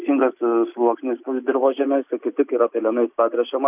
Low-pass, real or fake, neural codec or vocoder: 3.6 kHz; real; none